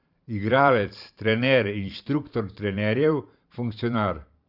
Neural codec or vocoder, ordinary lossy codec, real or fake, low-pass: vocoder, 44.1 kHz, 128 mel bands every 512 samples, BigVGAN v2; Opus, 64 kbps; fake; 5.4 kHz